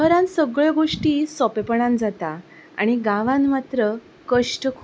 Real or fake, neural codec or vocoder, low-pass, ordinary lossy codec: real; none; none; none